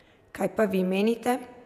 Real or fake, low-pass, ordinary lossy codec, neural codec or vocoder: fake; 14.4 kHz; none; vocoder, 48 kHz, 128 mel bands, Vocos